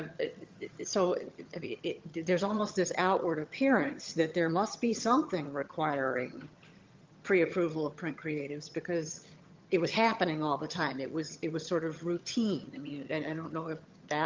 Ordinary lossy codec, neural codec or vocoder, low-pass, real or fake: Opus, 32 kbps; vocoder, 22.05 kHz, 80 mel bands, HiFi-GAN; 7.2 kHz; fake